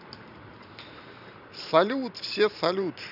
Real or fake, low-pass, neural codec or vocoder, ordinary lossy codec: fake; 5.4 kHz; vocoder, 44.1 kHz, 128 mel bands every 512 samples, BigVGAN v2; none